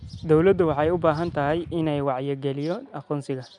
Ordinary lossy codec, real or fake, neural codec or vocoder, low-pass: none; real; none; 9.9 kHz